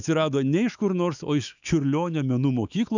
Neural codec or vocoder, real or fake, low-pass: autoencoder, 48 kHz, 128 numbers a frame, DAC-VAE, trained on Japanese speech; fake; 7.2 kHz